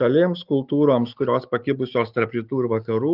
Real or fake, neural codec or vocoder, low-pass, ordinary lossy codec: real; none; 5.4 kHz; Opus, 24 kbps